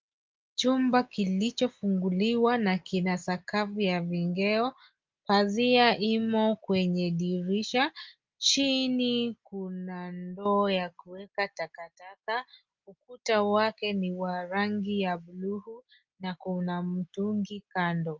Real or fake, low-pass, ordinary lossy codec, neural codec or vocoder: real; 7.2 kHz; Opus, 32 kbps; none